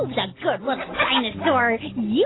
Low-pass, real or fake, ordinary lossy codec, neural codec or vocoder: 7.2 kHz; real; AAC, 16 kbps; none